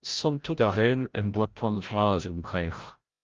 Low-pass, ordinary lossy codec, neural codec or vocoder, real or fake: 7.2 kHz; Opus, 32 kbps; codec, 16 kHz, 0.5 kbps, FreqCodec, larger model; fake